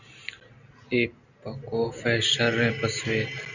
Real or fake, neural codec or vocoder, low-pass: real; none; 7.2 kHz